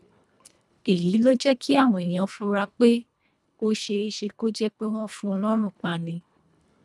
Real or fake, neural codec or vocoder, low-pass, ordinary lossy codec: fake; codec, 24 kHz, 1.5 kbps, HILCodec; none; none